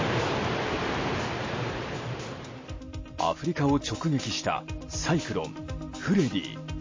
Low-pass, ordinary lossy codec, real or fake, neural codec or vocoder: 7.2 kHz; MP3, 32 kbps; real; none